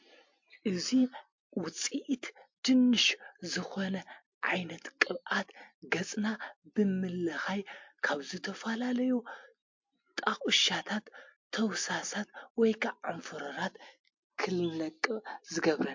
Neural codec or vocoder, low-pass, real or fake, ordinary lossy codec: none; 7.2 kHz; real; MP3, 48 kbps